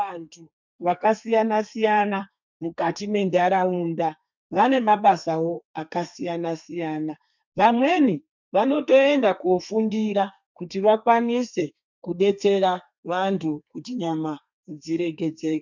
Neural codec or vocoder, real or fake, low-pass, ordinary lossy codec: codec, 32 kHz, 1.9 kbps, SNAC; fake; 7.2 kHz; MP3, 64 kbps